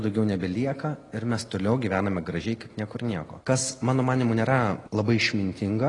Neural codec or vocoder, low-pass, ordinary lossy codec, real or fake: none; 10.8 kHz; AAC, 32 kbps; real